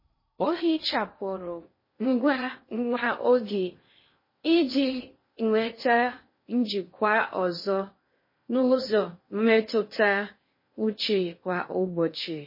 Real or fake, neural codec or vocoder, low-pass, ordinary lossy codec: fake; codec, 16 kHz in and 24 kHz out, 0.6 kbps, FocalCodec, streaming, 2048 codes; 5.4 kHz; MP3, 24 kbps